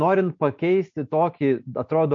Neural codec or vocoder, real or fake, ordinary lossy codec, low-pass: none; real; MP3, 48 kbps; 7.2 kHz